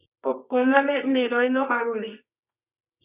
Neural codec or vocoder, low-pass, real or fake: codec, 24 kHz, 0.9 kbps, WavTokenizer, medium music audio release; 3.6 kHz; fake